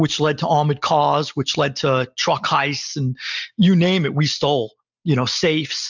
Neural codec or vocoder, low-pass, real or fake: none; 7.2 kHz; real